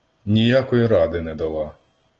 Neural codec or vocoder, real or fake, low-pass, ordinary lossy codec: none; real; 7.2 kHz; Opus, 16 kbps